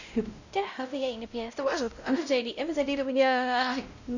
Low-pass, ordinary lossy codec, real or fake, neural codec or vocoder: 7.2 kHz; none; fake; codec, 16 kHz, 0.5 kbps, X-Codec, WavLM features, trained on Multilingual LibriSpeech